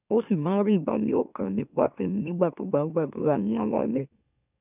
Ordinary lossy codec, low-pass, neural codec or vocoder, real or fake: none; 3.6 kHz; autoencoder, 44.1 kHz, a latent of 192 numbers a frame, MeloTTS; fake